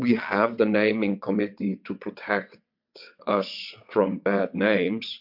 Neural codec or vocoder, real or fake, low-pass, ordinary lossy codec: vocoder, 22.05 kHz, 80 mel bands, WaveNeXt; fake; 5.4 kHz; MP3, 48 kbps